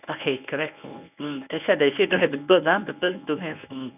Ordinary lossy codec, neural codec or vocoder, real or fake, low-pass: none; codec, 24 kHz, 0.9 kbps, WavTokenizer, medium speech release version 1; fake; 3.6 kHz